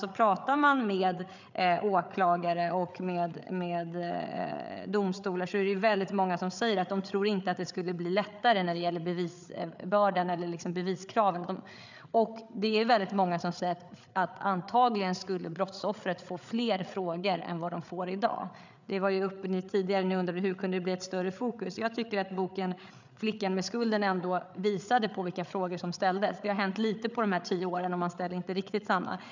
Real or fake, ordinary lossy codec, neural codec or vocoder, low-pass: fake; none; codec, 16 kHz, 8 kbps, FreqCodec, larger model; 7.2 kHz